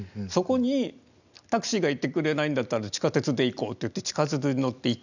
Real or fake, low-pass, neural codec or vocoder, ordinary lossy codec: real; 7.2 kHz; none; none